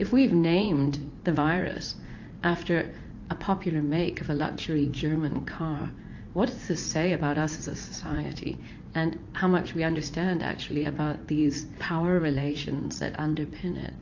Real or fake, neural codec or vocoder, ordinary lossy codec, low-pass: fake; vocoder, 44.1 kHz, 80 mel bands, Vocos; AAC, 48 kbps; 7.2 kHz